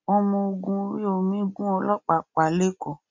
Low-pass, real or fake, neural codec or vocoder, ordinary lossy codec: 7.2 kHz; real; none; MP3, 64 kbps